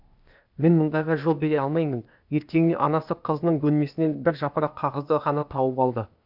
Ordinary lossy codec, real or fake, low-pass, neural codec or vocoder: none; fake; 5.4 kHz; codec, 16 kHz, 0.8 kbps, ZipCodec